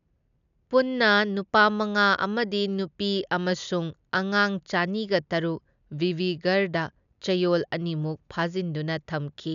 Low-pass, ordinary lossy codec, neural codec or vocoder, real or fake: 7.2 kHz; none; none; real